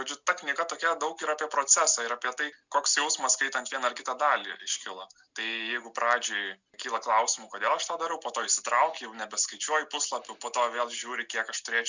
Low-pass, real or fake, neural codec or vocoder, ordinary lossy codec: 7.2 kHz; real; none; Opus, 64 kbps